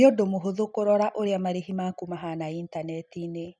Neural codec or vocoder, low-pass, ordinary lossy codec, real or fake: none; none; none; real